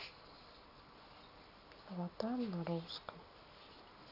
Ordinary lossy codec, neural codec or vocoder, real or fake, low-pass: none; none; real; 5.4 kHz